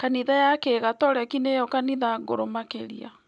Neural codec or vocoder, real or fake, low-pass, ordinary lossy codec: none; real; 10.8 kHz; none